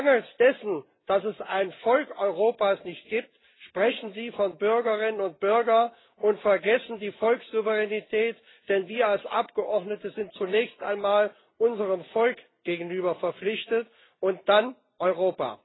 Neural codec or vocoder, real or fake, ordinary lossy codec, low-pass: none; real; AAC, 16 kbps; 7.2 kHz